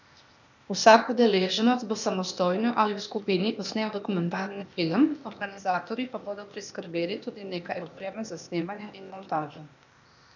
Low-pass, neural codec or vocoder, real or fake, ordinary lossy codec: 7.2 kHz; codec, 16 kHz, 0.8 kbps, ZipCodec; fake; none